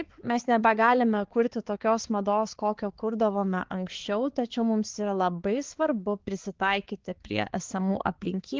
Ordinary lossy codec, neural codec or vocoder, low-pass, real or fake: Opus, 16 kbps; codec, 16 kHz, 4 kbps, X-Codec, HuBERT features, trained on balanced general audio; 7.2 kHz; fake